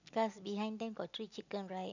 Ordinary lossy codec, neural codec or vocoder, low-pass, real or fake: none; none; 7.2 kHz; real